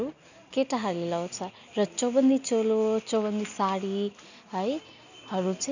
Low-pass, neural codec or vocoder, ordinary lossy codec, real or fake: 7.2 kHz; none; none; real